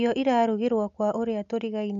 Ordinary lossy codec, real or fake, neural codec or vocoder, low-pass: none; real; none; 7.2 kHz